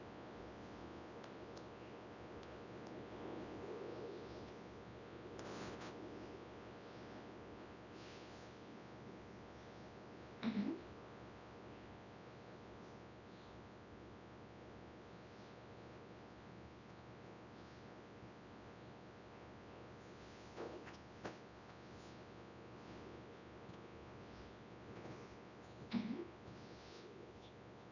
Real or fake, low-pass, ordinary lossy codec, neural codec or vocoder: fake; 7.2 kHz; none; codec, 24 kHz, 0.9 kbps, WavTokenizer, large speech release